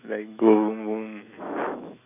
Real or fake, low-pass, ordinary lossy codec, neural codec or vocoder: fake; 3.6 kHz; none; autoencoder, 48 kHz, 128 numbers a frame, DAC-VAE, trained on Japanese speech